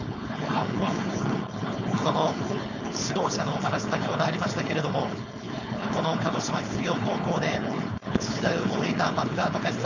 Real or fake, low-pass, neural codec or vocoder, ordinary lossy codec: fake; 7.2 kHz; codec, 16 kHz, 4.8 kbps, FACodec; none